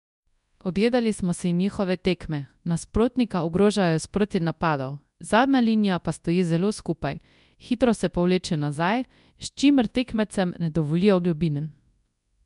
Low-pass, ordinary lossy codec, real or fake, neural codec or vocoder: 10.8 kHz; MP3, 96 kbps; fake; codec, 24 kHz, 0.9 kbps, WavTokenizer, large speech release